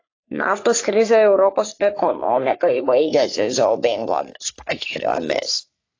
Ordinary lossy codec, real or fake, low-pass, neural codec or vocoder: AAC, 32 kbps; fake; 7.2 kHz; codec, 44.1 kHz, 3.4 kbps, Pupu-Codec